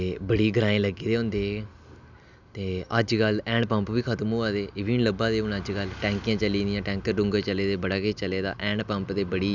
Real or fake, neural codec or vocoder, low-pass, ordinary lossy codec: real; none; 7.2 kHz; none